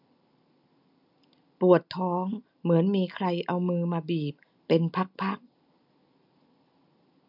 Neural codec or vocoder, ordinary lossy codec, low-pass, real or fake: none; none; 5.4 kHz; real